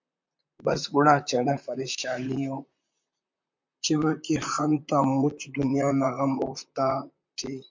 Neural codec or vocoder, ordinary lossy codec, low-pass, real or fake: vocoder, 44.1 kHz, 128 mel bands, Pupu-Vocoder; MP3, 64 kbps; 7.2 kHz; fake